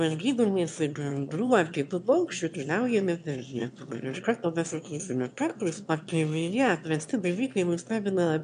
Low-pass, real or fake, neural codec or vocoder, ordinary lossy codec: 9.9 kHz; fake; autoencoder, 22.05 kHz, a latent of 192 numbers a frame, VITS, trained on one speaker; MP3, 64 kbps